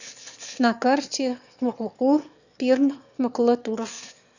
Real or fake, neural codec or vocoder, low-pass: fake; autoencoder, 22.05 kHz, a latent of 192 numbers a frame, VITS, trained on one speaker; 7.2 kHz